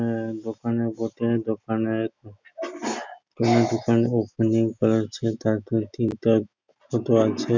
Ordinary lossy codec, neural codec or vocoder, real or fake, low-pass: none; none; real; none